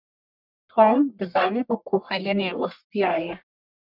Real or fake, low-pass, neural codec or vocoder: fake; 5.4 kHz; codec, 44.1 kHz, 1.7 kbps, Pupu-Codec